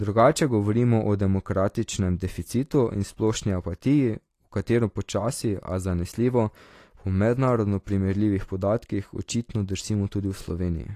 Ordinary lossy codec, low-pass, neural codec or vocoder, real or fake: AAC, 48 kbps; 14.4 kHz; none; real